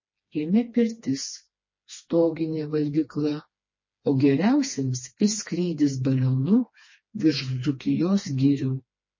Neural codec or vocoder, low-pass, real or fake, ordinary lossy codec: codec, 16 kHz, 2 kbps, FreqCodec, smaller model; 7.2 kHz; fake; MP3, 32 kbps